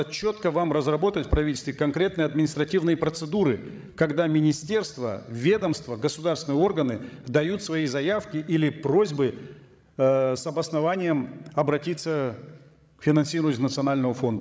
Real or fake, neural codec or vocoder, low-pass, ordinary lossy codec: fake; codec, 16 kHz, 16 kbps, FreqCodec, larger model; none; none